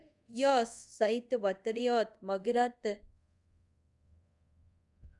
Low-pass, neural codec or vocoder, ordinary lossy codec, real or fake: 10.8 kHz; codec, 24 kHz, 0.5 kbps, DualCodec; none; fake